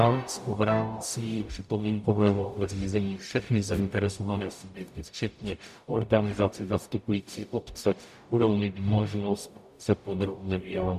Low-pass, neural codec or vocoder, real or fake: 14.4 kHz; codec, 44.1 kHz, 0.9 kbps, DAC; fake